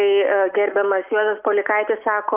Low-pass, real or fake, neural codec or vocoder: 3.6 kHz; real; none